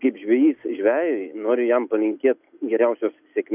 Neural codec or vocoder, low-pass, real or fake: none; 3.6 kHz; real